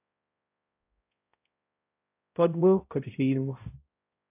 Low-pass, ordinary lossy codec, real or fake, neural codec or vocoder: 3.6 kHz; AAC, 32 kbps; fake; codec, 16 kHz, 0.5 kbps, X-Codec, HuBERT features, trained on balanced general audio